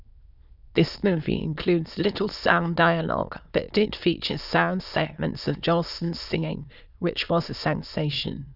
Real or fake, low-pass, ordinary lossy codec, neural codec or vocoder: fake; 5.4 kHz; none; autoencoder, 22.05 kHz, a latent of 192 numbers a frame, VITS, trained on many speakers